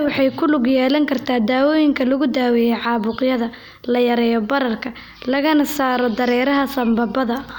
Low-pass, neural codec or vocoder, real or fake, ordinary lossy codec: 19.8 kHz; none; real; none